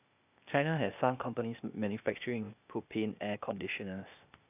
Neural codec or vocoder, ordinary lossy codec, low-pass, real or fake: codec, 16 kHz, 0.8 kbps, ZipCodec; none; 3.6 kHz; fake